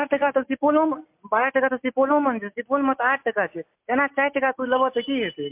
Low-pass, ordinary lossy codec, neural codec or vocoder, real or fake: 3.6 kHz; MP3, 32 kbps; none; real